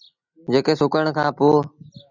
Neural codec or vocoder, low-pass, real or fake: none; 7.2 kHz; real